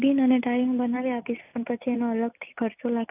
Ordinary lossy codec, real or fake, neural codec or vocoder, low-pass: none; real; none; 3.6 kHz